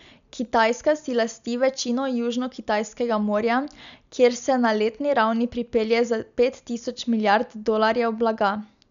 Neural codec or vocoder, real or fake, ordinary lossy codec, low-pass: none; real; none; 7.2 kHz